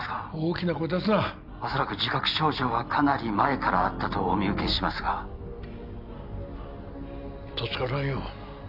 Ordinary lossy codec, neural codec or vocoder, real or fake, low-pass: none; none; real; 5.4 kHz